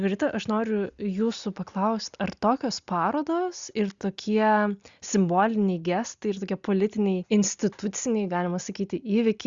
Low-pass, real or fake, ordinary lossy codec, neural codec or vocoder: 7.2 kHz; real; Opus, 64 kbps; none